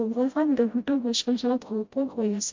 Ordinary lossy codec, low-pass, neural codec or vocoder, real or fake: MP3, 48 kbps; 7.2 kHz; codec, 16 kHz, 0.5 kbps, FreqCodec, smaller model; fake